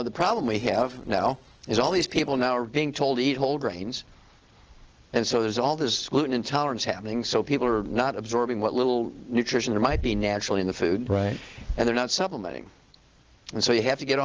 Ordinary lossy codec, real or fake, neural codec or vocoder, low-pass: Opus, 16 kbps; real; none; 7.2 kHz